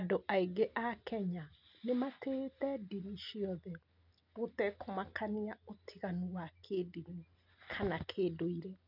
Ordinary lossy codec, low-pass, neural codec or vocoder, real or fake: none; 5.4 kHz; none; real